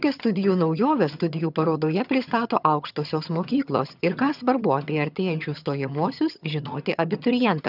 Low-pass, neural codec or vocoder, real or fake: 5.4 kHz; vocoder, 22.05 kHz, 80 mel bands, HiFi-GAN; fake